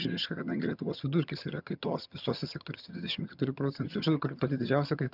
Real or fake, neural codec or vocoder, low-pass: fake; vocoder, 22.05 kHz, 80 mel bands, HiFi-GAN; 5.4 kHz